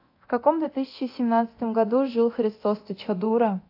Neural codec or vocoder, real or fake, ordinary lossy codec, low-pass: codec, 24 kHz, 0.9 kbps, DualCodec; fake; AAC, 32 kbps; 5.4 kHz